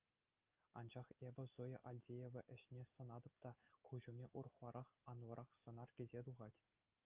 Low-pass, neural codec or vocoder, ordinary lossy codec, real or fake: 3.6 kHz; none; Opus, 24 kbps; real